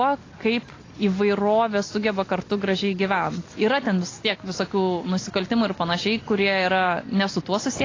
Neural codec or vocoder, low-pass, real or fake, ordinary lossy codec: none; 7.2 kHz; real; AAC, 32 kbps